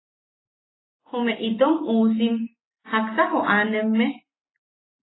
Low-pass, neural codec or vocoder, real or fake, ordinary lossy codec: 7.2 kHz; none; real; AAC, 16 kbps